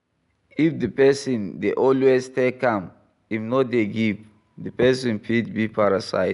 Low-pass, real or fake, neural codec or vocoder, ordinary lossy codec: 10.8 kHz; real; none; none